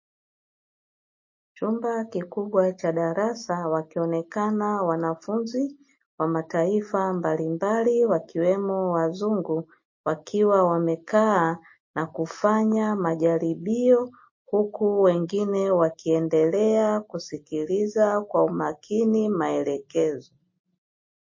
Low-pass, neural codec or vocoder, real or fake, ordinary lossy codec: 7.2 kHz; none; real; MP3, 32 kbps